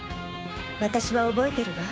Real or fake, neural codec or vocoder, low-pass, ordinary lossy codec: fake; codec, 16 kHz, 6 kbps, DAC; none; none